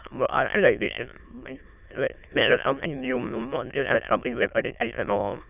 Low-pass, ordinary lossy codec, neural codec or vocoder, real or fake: 3.6 kHz; none; autoencoder, 22.05 kHz, a latent of 192 numbers a frame, VITS, trained on many speakers; fake